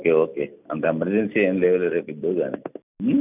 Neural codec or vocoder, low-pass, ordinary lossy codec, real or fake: none; 3.6 kHz; none; real